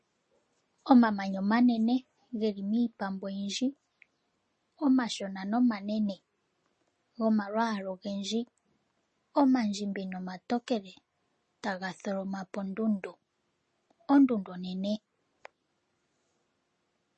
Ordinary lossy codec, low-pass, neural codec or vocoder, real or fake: MP3, 32 kbps; 10.8 kHz; none; real